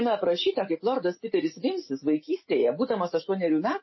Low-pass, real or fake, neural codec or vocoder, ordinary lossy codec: 7.2 kHz; real; none; MP3, 24 kbps